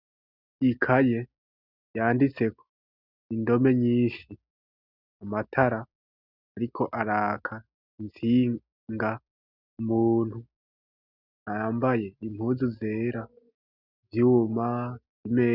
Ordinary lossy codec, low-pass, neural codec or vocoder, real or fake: Opus, 64 kbps; 5.4 kHz; none; real